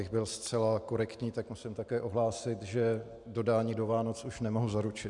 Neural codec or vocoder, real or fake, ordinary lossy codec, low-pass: none; real; MP3, 96 kbps; 10.8 kHz